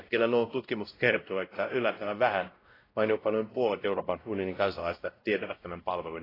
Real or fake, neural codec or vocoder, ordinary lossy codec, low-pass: fake; codec, 16 kHz, 0.5 kbps, X-Codec, WavLM features, trained on Multilingual LibriSpeech; AAC, 24 kbps; 5.4 kHz